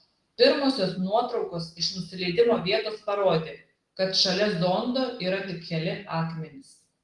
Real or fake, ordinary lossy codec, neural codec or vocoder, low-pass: real; Opus, 24 kbps; none; 10.8 kHz